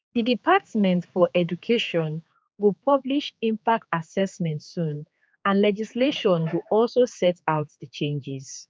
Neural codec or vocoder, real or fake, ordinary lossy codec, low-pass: codec, 16 kHz, 4 kbps, X-Codec, HuBERT features, trained on general audio; fake; none; none